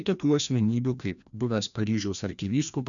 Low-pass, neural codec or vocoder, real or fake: 7.2 kHz; codec, 16 kHz, 1 kbps, FreqCodec, larger model; fake